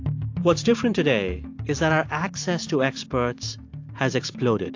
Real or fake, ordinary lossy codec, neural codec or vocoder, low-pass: real; AAC, 48 kbps; none; 7.2 kHz